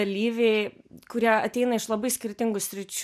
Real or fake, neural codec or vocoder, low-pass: real; none; 14.4 kHz